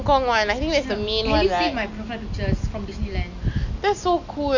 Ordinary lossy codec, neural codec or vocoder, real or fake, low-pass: none; none; real; 7.2 kHz